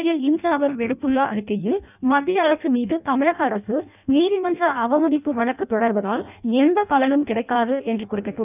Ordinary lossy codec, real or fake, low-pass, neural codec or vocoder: none; fake; 3.6 kHz; codec, 16 kHz in and 24 kHz out, 0.6 kbps, FireRedTTS-2 codec